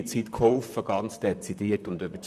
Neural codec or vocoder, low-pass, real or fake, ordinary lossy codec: vocoder, 44.1 kHz, 128 mel bands, Pupu-Vocoder; 14.4 kHz; fake; none